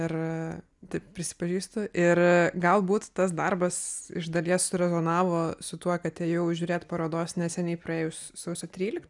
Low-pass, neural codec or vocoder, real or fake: 10.8 kHz; none; real